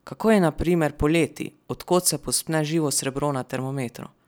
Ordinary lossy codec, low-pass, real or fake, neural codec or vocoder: none; none; real; none